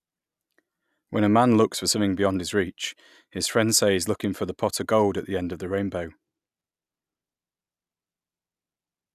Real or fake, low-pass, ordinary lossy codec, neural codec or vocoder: real; 14.4 kHz; none; none